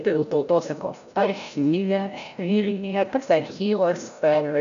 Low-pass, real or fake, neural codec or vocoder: 7.2 kHz; fake; codec, 16 kHz, 0.5 kbps, FreqCodec, larger model